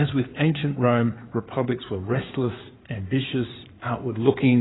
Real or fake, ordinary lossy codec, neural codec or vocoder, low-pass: fake; AAC, 16 kbps; codec, 16 kHz in and 24 kHz out, 2.2 kbps, FireRedTTS-2 codec; 7.2 kHz